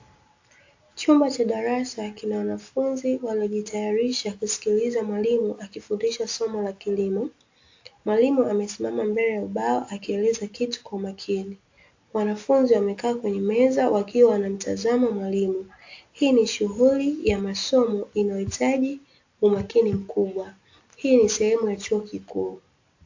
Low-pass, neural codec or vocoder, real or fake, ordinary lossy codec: 7.2 kHz; none; real; AAC, 48 kbps